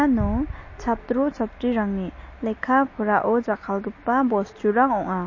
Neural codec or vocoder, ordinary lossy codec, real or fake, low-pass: none; MP3, 32 kbps; real; 7.2 kHz